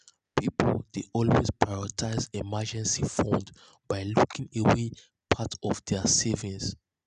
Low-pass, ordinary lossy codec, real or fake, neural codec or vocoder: 9.9 kHz; none; real; none